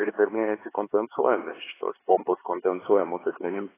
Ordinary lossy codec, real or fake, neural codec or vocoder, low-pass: AAC, 16 kbps; fake; codec, 16 kHz, 4 kbps, X-Codec, HuBERT features, trained on LibriSpeech; 3.6 kHz